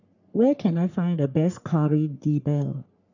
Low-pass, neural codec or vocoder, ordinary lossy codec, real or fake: 7.2 kHz; codec, 44.1 kHz, 3.4 kbps, Pupu-Codec; none; fake